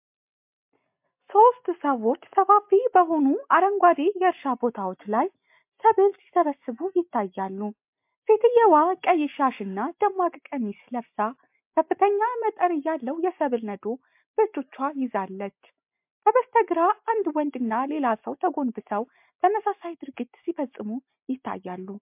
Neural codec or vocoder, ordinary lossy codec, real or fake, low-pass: none; MP3, 32 kbps; real; 3.6 kHz